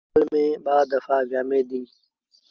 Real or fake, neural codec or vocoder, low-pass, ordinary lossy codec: real; none; 7.2 kHz; Opus, 24 kbps